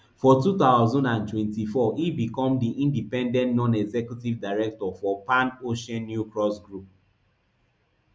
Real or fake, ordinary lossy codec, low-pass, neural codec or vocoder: real; none; none; none